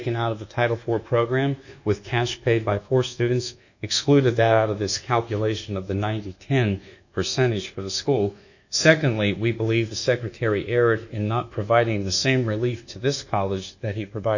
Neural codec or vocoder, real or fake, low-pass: codec, 24 kHz, 1.2 kbps, DualCodec; fake; 7.2 kHz